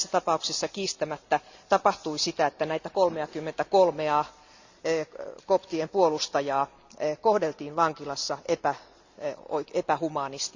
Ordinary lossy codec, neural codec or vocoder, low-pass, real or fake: Opus, 64 kbps; none; 7.2 kHz; real